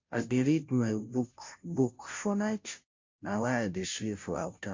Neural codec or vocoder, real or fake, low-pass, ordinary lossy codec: codec, 16 kHz, 0.5 kbps, FunCodec, trained on Chinese and English, 25 frames a second; fake; 7.2 kHz; MP3, 48 kbps